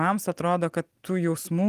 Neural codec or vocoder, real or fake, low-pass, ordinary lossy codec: none; real; 14.4 kHz; Opus, 24 kbps